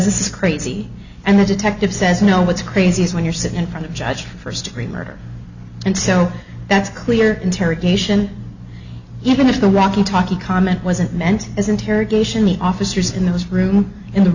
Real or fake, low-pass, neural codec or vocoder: real; 7.2 kHz; none